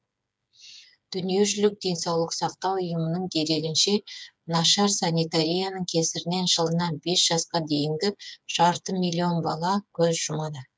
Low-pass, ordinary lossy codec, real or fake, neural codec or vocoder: none; none; fake; codec, 16 kHz, 8 kbps, FreqCodec, smaller model